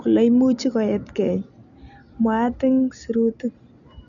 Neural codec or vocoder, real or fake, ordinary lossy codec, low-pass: none; real; none; 7.2 kHz